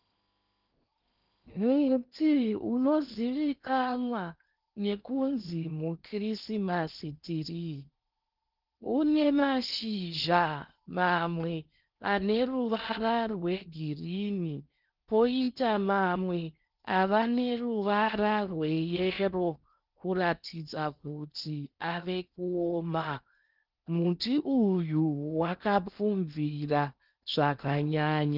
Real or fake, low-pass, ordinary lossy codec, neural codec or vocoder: fake; 5.4 kHz; Opus, 24 kbps; codec, 16 kHz in and 24 kHz out, 0.8 kbps, FocalCodec, streaming, 65536 codes